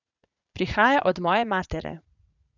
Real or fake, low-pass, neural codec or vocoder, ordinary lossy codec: fake; 7.2 kHz; vocoder, 44.1 kHz, 80 mel bands, Vocos; none